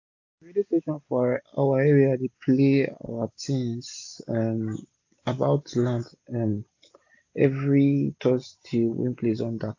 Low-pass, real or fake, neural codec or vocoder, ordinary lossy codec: 7.2 kHz; real; none; AAC, 48 kbps